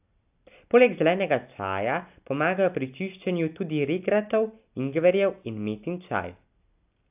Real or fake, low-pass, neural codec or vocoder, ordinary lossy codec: real; 3.6 kHz; none; AAC, 32 kbps